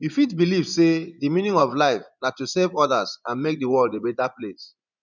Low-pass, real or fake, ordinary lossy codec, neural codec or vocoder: 7.2 kHz; fake; none; vocoder, 24 kHz, 100 mel bands, Vocos